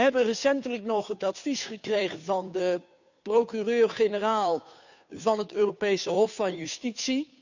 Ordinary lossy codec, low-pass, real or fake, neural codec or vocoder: none; 7.2 kHz; fake; codec, 16 kHz, 2 kbps, FunCodec, trained on Chinese and English, 25 frames a second